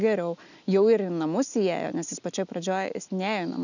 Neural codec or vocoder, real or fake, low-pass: none; real; 7.2 kHz